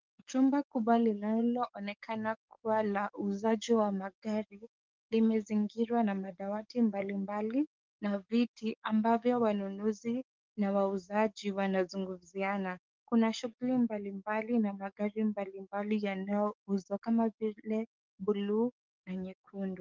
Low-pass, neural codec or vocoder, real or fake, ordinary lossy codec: 7.2 kHz; codec, 44.1 kHz, 7.8 kbps, DAC; fake; Opus, 32 kbps